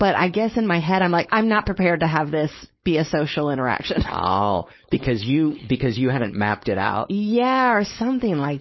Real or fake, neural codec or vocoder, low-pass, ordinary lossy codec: fake; codec, 16 kHz, 4.8 kbps, FACodec; 7.2 kHz; MP3, 24 kbps